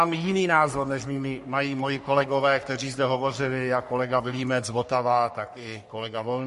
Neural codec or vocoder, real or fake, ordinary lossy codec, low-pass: codec, 44.1 kHz, 3.4 kbps, Pupu-Codec; fake; MP3, 48 kbps; 14.4 kHz